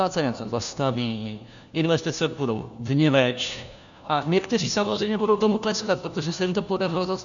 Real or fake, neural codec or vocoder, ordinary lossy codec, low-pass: fake; codec, 16 kHz, 1 kbps, FunCodec, trained on LibriTTS, 50 frames a second; MP3, 96 kbps; 7.2 kHz